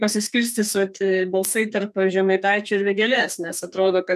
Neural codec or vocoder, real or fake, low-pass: codec, 32 kHz, 1.9 kbps, SNAC; fake; 14.4 kHz